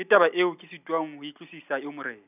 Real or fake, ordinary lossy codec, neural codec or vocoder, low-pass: real; none; none; 3.6 kHz